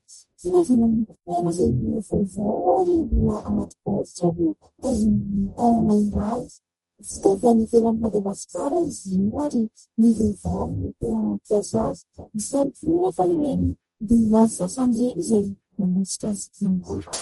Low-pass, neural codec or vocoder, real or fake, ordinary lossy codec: 19.8 kHz; codec, 44.1 kHz, 0.9 kbps, DAC; fake; MP3, 48 kbps